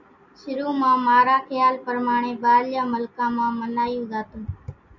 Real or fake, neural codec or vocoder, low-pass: real; none; 7.2 kHz